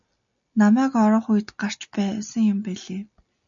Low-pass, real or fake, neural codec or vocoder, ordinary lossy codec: 7.2 kHz; real; none; MP3, 64 kbps